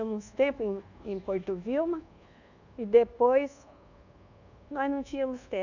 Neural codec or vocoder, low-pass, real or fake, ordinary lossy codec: codec, 24 kHz, 1.2 kbps, DualCodec; 7.2 kHz; fake; none